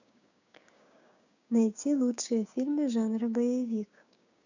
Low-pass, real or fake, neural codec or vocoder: 7.2 kHz; fake; codec, 16 kHz, 6 kbps, DAC